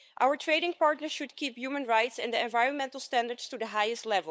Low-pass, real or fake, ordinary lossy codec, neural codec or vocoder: none; fake; none; codec, 16 kHz, 8 kbps, FunCodec, trained on LibriTTS, 25 frames a second